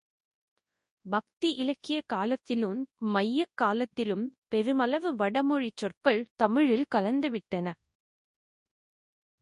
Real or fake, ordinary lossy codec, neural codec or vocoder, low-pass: fake; MP3, 48 kbps; codec, 24 kHz, 0.9 kbps, WavTokenizer, large speech release; 10.8 kHz